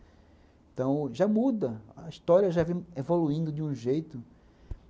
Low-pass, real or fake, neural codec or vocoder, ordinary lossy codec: none; real; none; none